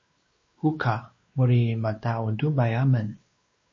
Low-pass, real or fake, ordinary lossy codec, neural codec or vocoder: 7.2 kHz; fake; MP3, 32 kbps; codec, 16 kHz, 2 kbps, X-Codec, WavLM features, trained on Multilingual LibriSpeech